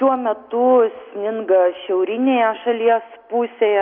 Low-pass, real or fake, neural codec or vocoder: 5.4 kHz; real; none